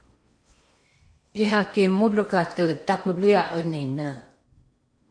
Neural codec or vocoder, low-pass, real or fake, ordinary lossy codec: codec, 16 kHz in and 24 kHz out, 0.8 kbps, FocalCodec, streaming, 65536 codes; 9.9 kHz; fake; MP3, 48 kbps